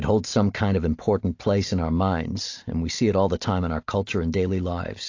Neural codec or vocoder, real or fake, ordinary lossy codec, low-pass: none; real; AAC, 48 kbps; 7.2 kHz